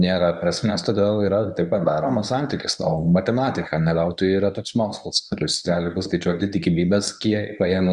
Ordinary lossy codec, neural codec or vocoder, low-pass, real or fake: Opus, 64 kbps; codec, 24 kHz, 0.9 kbps, WavTokenizer, medium speech release version 1; 10.8 kHz; fake